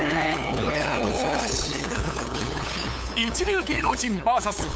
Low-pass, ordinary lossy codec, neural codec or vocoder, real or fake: none; none; codec, 16 kHz, 8 kbps, FunCodec, trained on LibriTTS, 25 frames a second; fake